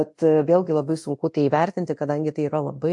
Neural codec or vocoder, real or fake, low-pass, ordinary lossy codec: codec, 24 kHz, 0.9 kbps, DualCodec; fake; 10.8 kHz; MP3, 48 kbps